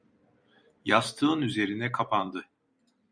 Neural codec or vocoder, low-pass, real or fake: vocoder, 44.1 kHz, 128 mel bands every 256 samples, BigVGAN v2; 9.9 kHz; fake